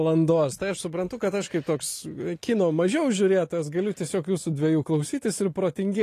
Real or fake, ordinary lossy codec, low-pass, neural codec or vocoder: real; AAC, 48 kbps; 14.4 kHz; none